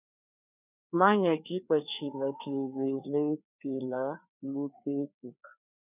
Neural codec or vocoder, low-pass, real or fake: codec, 16 kHz, 2 kbps, FreqCodec, larger model; 3.6 kHz; fake